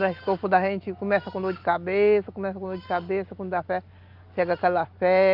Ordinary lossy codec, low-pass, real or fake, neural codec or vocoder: Opus, 32 kbps; 5.4 kHz; real; none